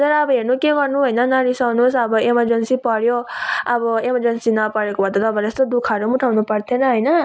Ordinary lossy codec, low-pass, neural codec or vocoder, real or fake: none; none; none; real